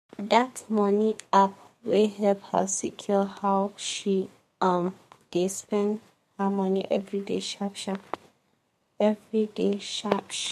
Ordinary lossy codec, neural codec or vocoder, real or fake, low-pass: MP3, 64 kbps; codec, 32 kHz, 1.9 kbps, SNAC; fake; 14.4 kHz